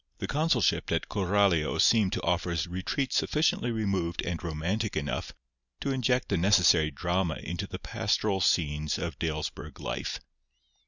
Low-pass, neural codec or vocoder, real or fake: 7.2 kHz; none; real